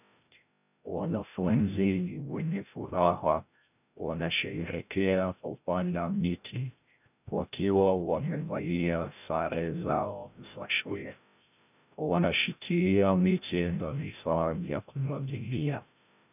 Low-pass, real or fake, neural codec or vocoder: 3.6 kHz; fake; codec, 16 kHz, 0.5 kbps, FreqCodec, larger model